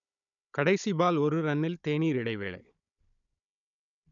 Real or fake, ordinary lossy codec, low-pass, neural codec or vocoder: fake; none; 7.2 kHz; codec, 16 kHz, 16 kbps, FunCodec, trained on Chinese and English, 50 frames a second